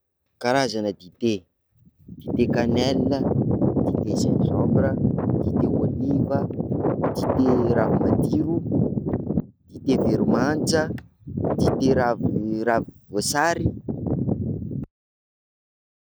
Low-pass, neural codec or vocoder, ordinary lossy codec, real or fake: none; none; none; real